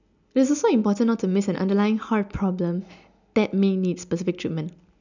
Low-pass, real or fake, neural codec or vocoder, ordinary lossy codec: 7.2 kHz; real; none; none